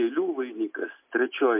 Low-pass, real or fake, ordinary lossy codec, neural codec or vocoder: 3.6 kHz; real; MP3, 24 kbps; none